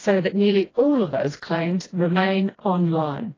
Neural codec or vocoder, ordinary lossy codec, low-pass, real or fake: codec, 16 kHz, 1 kbps, FreqCodec, smaller model; AAC, 32 kbps; 7.2 kHz; fake